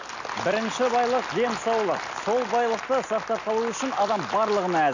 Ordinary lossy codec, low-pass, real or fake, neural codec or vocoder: none; 7.2 kHz; real; none